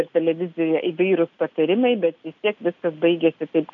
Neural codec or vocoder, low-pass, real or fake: none; 7.2 kHz; real